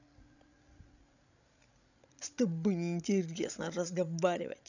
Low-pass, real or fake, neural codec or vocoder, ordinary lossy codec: 7.2 kHz; fake; codec, 16 kHz, 16 kbps, FreqCodec, larger model; none